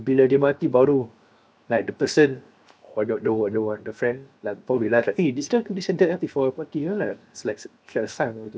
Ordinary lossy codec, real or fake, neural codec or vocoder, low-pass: none; fake; codec, 16 kHz, 0.7 kbps, FocalCodec; none